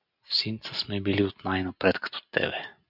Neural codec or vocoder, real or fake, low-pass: none; real; 5.4 kHz